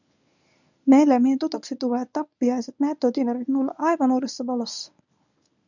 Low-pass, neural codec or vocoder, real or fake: 7.2 kHz; codec, 24 kHz, 0.9 kbps, WavTokenizer, medium speech release version 1; fake